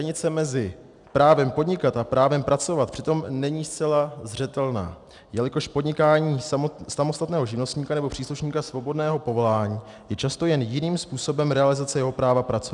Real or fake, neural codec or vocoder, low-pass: real; none; 10.8 kHz